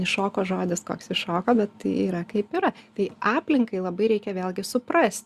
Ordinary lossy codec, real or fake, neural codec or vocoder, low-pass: Opus, 64 kbps; real; none; 14.4 kHz